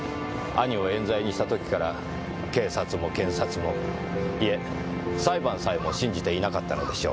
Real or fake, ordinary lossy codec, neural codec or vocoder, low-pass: real; none; none; none